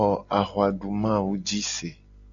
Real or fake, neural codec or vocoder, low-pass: real; none; 7.2 kHz